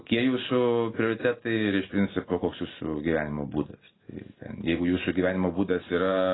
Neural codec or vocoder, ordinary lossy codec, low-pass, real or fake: none; AAC, 16 kbps; 7.2 kHz; real